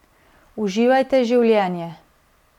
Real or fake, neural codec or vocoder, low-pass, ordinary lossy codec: real; none; 19.8 kHz; none